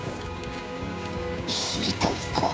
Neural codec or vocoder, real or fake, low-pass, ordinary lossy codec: codec, 16 kHz, 6 kbps, DAC; fake; none; none